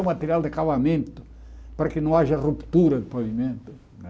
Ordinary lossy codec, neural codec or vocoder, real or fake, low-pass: none; none; real; none